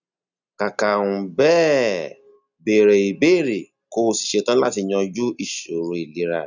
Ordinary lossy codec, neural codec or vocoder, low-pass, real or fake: none; none; 7.2 kHz; real